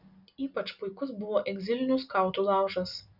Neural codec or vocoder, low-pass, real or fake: none; 5.4 kHz; real